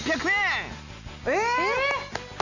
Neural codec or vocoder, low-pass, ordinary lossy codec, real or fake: none; 7.2 kHz; none; real